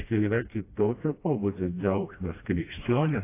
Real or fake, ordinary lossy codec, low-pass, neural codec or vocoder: fake; AAC, 24 kbps; 3.6 kHz; codec, 16 kHz, 1 kbps, FreqCodec, smaller model